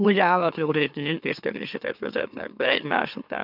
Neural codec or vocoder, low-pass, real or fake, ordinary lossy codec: autoencoder, 44.1 kHz, a latent of 192 numbers a frame, MeloTTS; 5.4 kHz; fake; none